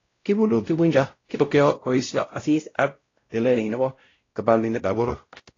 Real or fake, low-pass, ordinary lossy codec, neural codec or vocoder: fake; 7.2 kHz; AAC, 32 kbps; codec, 16 kHz, 0.5 kbps, X-Codec, WavLM features, trained on Multilingual LibriSpeech